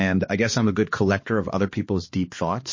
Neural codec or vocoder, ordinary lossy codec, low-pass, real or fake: codec, 16 kHz, 2 kbps, X-Codec, HuBERT features, trained on balanced general audio; MP3, 32 kbps; 7.2 kHz; fake